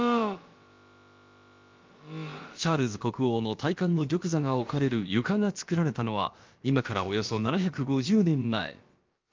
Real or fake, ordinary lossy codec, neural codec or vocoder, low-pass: fake; Opus, 24 kbps; codec, 16 kHz, about 1 kbps, DyCAST, with the encoder's durations; 7.2 kHz